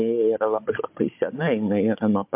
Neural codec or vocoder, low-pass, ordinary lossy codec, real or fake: codec, 16 kHz, 16 kbps, FunCodec, trained on Chinese and English, 50 frames a second; 3.6 kHz; MP3, 32 kbps; fake